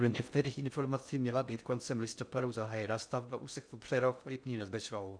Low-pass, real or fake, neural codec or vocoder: 9.9 kHz; fake; codec, 16 kHz in and 24 kHz out, 0.6 kbps, FocalCodec, streaming, 2048 codes